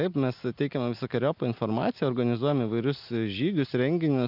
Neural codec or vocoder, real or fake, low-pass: none; real; 5.4 kHz